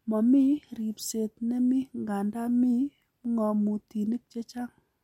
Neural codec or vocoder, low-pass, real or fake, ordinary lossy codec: none; 19.8 kHz; real; MP3, 64 kbps